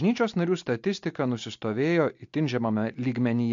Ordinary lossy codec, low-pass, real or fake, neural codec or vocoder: MP3, 48 kbps; 7.2 kHz; real; none